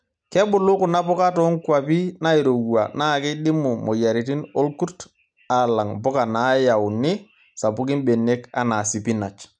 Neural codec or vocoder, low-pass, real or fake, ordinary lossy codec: none; 9.9 kHz; real; none